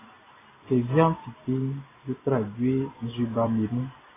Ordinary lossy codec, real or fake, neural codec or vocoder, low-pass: AAC, 16 kbps; real; none; 3.6 kHz